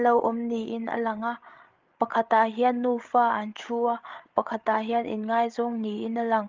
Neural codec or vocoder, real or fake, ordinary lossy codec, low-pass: codec, 16 kHz, 8 kbps, FreqCodec, larger model; fake; Opus, 32 kbps; 7.2 kHz